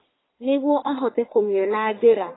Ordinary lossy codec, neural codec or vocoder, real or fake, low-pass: AAC, 16 kbps; codec, 44.1 kHz, 1.7 kbps, Pupu-Codec; fake; 7.2 kHz